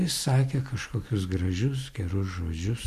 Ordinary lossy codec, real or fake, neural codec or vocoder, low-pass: MP3, 64 kbps; real; none; 14.4 kHz